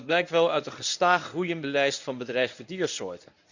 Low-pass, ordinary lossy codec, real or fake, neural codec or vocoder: 7.2 kHz; none; fake; codec, 24 kHz, 0.9 kbps, WavTokenizer, medium speech release version 1